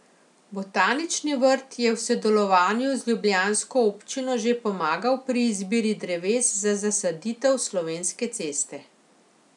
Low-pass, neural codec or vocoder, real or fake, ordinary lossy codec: 10.8 kHz; none; real; none